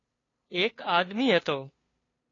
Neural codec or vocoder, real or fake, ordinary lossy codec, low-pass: codec, 16 kHz, 2 kbps, FunCodec, trained on LibriTTS, 25 frames a second; fake; AAC, 32 kbps; 7.2 kHz